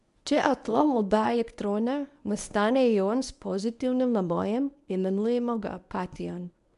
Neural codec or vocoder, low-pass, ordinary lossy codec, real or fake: codec, 24 kHz, 0.9 kbps, WavTokenizer, medium speech release version 1; 10.8 kHz; none; fake